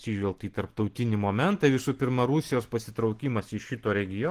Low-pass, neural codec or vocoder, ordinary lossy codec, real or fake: 14.4 kHz; none; Opus, 16 kbps; real